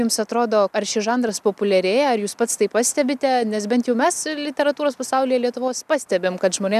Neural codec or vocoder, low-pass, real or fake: none; 14.4 kHz; real